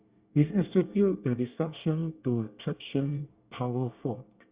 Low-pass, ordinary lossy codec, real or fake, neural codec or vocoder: 3.6 kHz; Opus, 64 kbps; fake; codec, 24 kHz, 1 kbps, SNAC